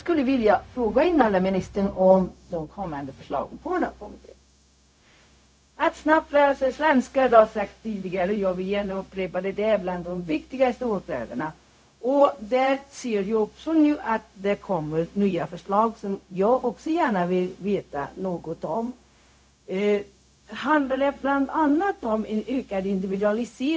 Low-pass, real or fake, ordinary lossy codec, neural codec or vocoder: none; fake; none; codec, 16 kHz, 0.4 kbps, LongCat-Audio-Codec